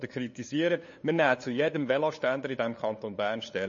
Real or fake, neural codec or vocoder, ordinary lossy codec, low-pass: fake; codec, 16 kHz, 8 kbps, FunCodec, trained on LibriTTS, 25 frames a second; MP3, 32 kbps; 7.2 kHz